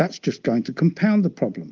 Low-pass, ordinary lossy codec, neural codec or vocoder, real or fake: 7.2 kHz; Opus, 24 kbps; none; real